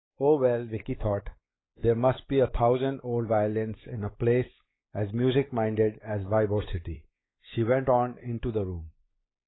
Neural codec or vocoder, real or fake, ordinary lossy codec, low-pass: codec, 16 kHz, 4 kbps, X-Codec, WavLM features, trained on Multilingual LibriSpeech; fake; AAC, 16 kbps; 7.2 kHz